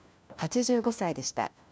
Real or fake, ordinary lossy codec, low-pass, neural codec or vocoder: fake; none; none; codec, 16 kHz, 1 kbps, FunCodec, trained on LibriTTS, 50 frames a second